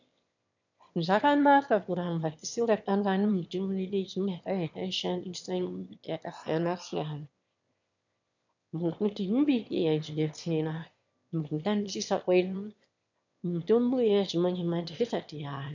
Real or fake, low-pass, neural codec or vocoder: fake; 7.2 kHz; autoencoder, 22.05 kHz, a latent of 192 numbers a frame, VITS, trained on one speaker